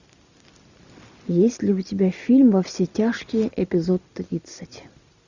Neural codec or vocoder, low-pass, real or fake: none; 7.2 kHz; real